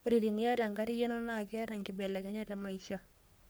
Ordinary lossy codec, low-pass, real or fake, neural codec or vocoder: none; none; fake; codec, 44.1 kHz, 3.4 kbps, Pupu-Codec